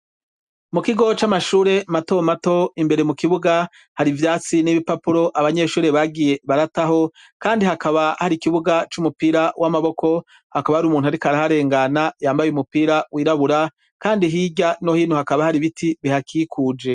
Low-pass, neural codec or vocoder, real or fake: 10.8 kHz; none; real